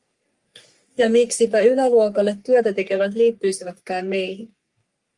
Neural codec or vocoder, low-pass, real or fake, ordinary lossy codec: codec, 44.1 kHz, 3.4 kbps, Pupu-Codec; 10.8 kHz; fake; Opus, 32 kbps